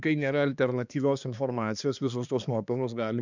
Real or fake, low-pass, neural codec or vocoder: fake; 7.2 kHz; codec, 16 kHz, 2 kbps, X-Codec, HuBERT features, trained on balanced general audio